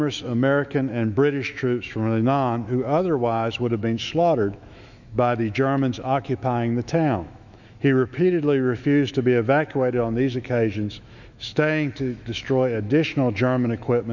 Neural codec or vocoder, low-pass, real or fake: autoencoder, 48 kHz, 128 numbers a frame, DAC-VAE, trained on Japanese speech; 7.2 kHz; fake